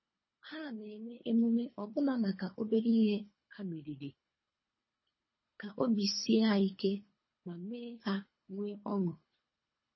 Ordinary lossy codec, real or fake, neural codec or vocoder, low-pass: MP3, 24 kbps; fake; codec, 24 kHz, 3 kbps, HILCodec; 7.2 kHz